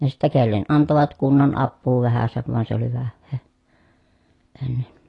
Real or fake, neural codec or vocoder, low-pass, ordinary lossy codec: real; none; 10.8 kHz; AAC, 32 kbps